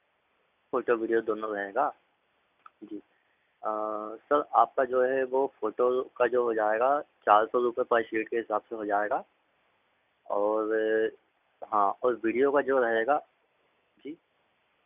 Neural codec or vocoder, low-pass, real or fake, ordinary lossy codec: none; 3.6 kHz; real; none